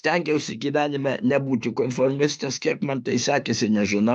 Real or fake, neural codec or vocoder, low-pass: fake; autoencoder, 48 kHz, 32 numbers a frame, DAC-VAE, trained on Japanese speech; 9.9 kHz